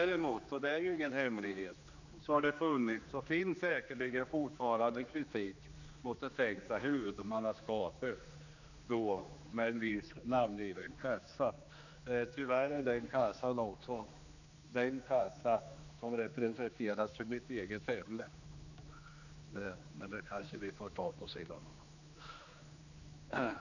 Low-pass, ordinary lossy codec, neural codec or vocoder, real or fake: 7.2 kHz; none; codec, 16 kHz, 2 kbps, X-Codec, HuBERT features, trained on general audio; fake